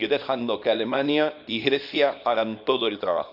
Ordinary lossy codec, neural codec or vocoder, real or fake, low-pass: none; codec, 24 kHz, 0.9 kbps, WavTokenizer, small release; fake; 5.4 kHz